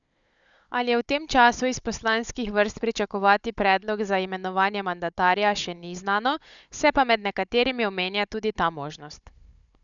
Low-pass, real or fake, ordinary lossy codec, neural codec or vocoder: 7.2 kHz; fake; none; codec, 16 kHz, 16 kbps, FunCodec, trained on Chinese and English, 50 frames a second